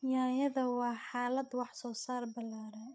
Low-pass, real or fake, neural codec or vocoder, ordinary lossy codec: none; fake; codec, 16 kHz, 8 kbps, FreqCodec, larger model; none